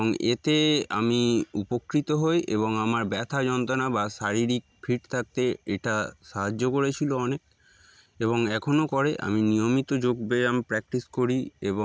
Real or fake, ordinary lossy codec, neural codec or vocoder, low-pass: real; none; none; none